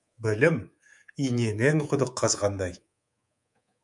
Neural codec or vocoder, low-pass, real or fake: codec, 24 kHz, 3.1 kbps, DualCodec; 10.8 kHz; fake